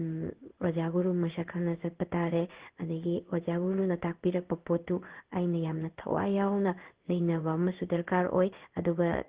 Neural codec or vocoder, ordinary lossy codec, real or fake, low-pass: codec, 16 kHz in and 24 kHz out, 1 kbps, XY-Tokenizer; Opus, 16 kbps; fake; 3.6 kHz